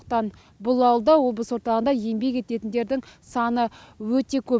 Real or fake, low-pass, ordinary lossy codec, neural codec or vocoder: real; none; none; none